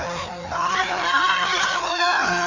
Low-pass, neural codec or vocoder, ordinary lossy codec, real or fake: 7.2 kHz; codec, 16 kHz, 2 kbps, FreqCodec, larger model; none; fake